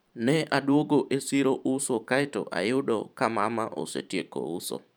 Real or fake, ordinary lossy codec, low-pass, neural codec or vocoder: fake; none; none; vocoder, 44.1 kHz, 128 mel bands every 512 samples, BigVGAN v2